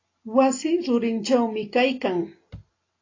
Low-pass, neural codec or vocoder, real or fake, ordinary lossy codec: 7.2 kHz; none; real; AAC, 32 kbps